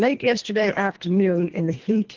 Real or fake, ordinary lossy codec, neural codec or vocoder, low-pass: fake; Opus, 16 kbps; codec, 24 kHz, 1.5 kbps, HILCodec; 7.2 kHz